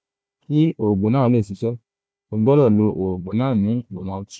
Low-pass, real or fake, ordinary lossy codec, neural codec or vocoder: none; fake; none; codec, 16 kHz, 1 kbps, FunCodec, trained on Chinese and English, 50 frames a second